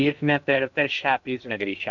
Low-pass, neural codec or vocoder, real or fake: 7.2 kHz; codec, 16 kHz in and 24 kHz out, 0.8 kbps, FocalCodec, streaming, 65536 codes; fake